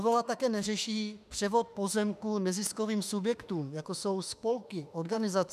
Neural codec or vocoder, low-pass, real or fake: autoencoder, 48 kHz, 32 numbers a frame, DAC-VAE, trained on Japanese speech; 14.4 kHz; fake